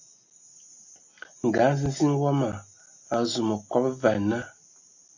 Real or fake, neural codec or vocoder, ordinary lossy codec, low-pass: real; none; AAC, 48 kbps; 7.2 kHz